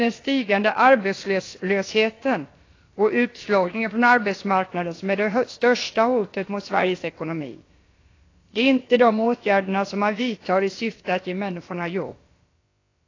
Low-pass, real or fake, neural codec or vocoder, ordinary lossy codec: 7.2 kHz; fake; codec, 16 kHz, about 1 kbps, DyCAST, with the encoder's durations; AAC, 32 kbps